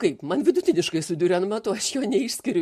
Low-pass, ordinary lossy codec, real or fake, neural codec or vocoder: 14.4 kHz; MP3, 64 kbps; real; none